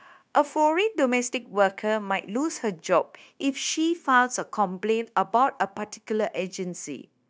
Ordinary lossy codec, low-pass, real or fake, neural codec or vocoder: none; none; fake; codec, 16 kHz, 0.9 kbps, LongCat-Audio-Codec